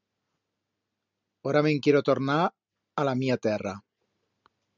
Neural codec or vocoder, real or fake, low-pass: none; real; 7.2 kHz